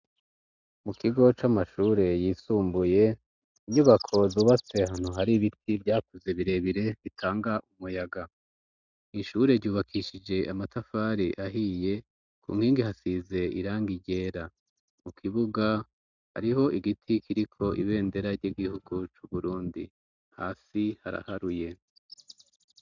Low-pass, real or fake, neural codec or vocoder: 7.2 kHz; real; none